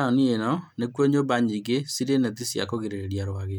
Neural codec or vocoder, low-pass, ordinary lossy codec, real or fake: none; 19.8 kHz; none; real